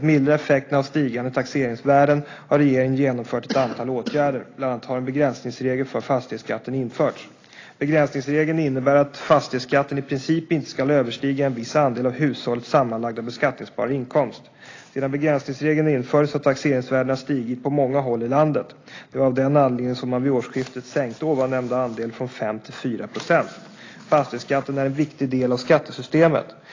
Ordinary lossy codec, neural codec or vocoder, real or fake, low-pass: AAC, 32 kbps; none; real; 7.2 kHz